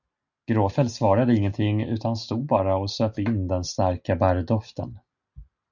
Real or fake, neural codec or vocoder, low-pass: real; none; 7.2 kHz